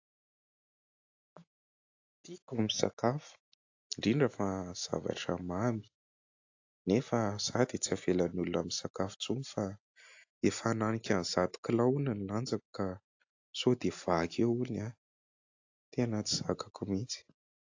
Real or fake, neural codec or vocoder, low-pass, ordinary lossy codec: real; none; 7.2 kHz; AAC, 48 kbps